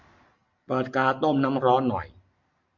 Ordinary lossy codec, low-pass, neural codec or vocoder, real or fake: MP3, 64 kbps; 7.2 kHz; none; real